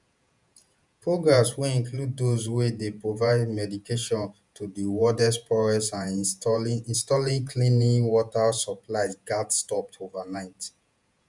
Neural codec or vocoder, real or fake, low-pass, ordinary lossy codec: vocoder, 48 kHz, 128 mel bands, Vocos; fake; 10.8 kHz; none